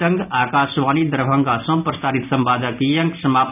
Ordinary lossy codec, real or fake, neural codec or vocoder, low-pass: none; real; none; 3.6 kHz